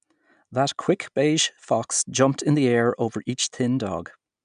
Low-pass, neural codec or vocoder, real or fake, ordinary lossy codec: 9.9 kHz; none; real; none